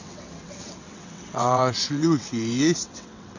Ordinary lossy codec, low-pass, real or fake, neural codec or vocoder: none; 7.2 kHz; fake; vocoder, 22.05 kHz, 80 mel bands, WaveNeXt